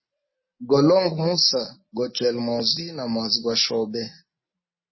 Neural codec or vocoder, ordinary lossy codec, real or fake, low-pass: none; MP3, 24 kbps; real; 7.2 kHz